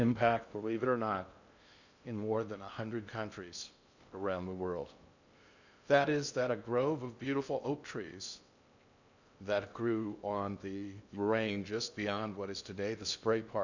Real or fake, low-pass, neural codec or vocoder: fake; 7.2 kHz; codec, 16 kHz in and 24 kHz out, 0.6 kbps, FocalCodec, streaming, 2048 codes